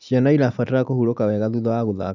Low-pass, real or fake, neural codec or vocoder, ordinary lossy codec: 7.2 kHz; real; none; none